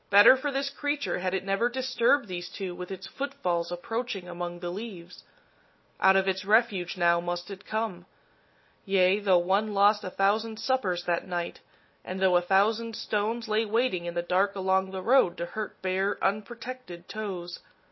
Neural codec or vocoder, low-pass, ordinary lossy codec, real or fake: none; 7.2 kHz; MP3, 24 kbps; real